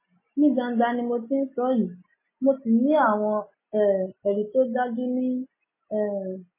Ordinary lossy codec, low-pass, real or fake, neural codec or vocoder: MP3, 16 kbps; 3.6 kHz; real; none